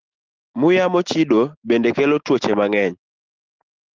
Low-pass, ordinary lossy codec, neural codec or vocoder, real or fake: 7.2 kHz; Opus, 16 kbps; none; real